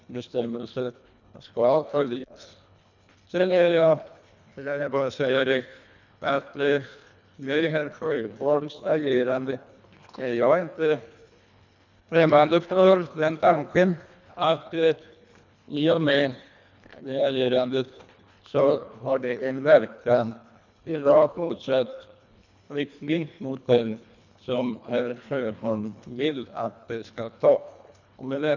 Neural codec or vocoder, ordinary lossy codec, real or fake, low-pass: codec, 24 kHz, 1.5 kbps, HILCodec; none; fake; 7.2 kHz